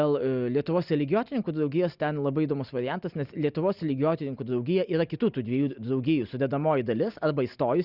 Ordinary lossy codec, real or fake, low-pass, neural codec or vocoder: Opus, 64 kbps; real; 5.4 kHz; none